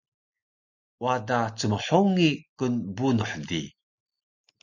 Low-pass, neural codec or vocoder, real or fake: 7.2 kHz; none; real